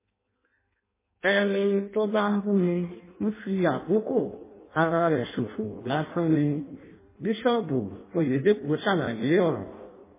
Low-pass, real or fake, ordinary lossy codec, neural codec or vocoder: 3.6 kHz; fake; MP3, 16 kbps; codec, 16 kHz in and 24 kHz out, 0.6 kbps, FireRedTTS-2 codec